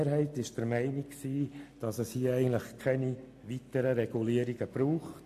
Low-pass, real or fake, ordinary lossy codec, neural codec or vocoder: 14.4 kHz; real; AAC, 96 kbps; none